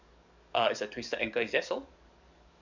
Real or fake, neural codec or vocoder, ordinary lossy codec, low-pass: fake; codec, 44.1 kHz, 7.8 kbps, DAC; none; 7.2 kHz